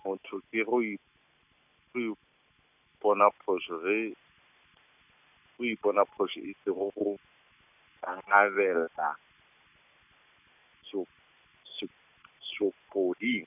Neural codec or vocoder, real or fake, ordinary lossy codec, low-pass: none; real; none; 3.6 kHz